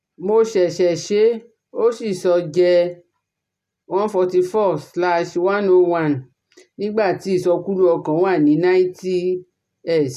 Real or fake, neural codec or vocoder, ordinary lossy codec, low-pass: real; none; none; 14.4 kHz